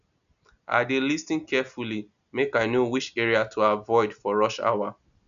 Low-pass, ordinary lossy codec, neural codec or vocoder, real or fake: 7.2 kHz; none; none; real